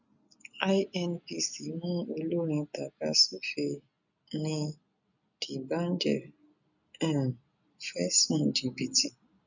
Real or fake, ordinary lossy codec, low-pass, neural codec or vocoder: real; none; 7.2 kHz; none